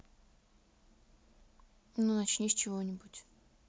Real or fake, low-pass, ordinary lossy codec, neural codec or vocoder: real; none; none; none